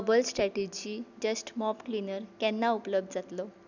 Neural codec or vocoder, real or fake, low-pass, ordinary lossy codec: none; real; 7.2 kHz; none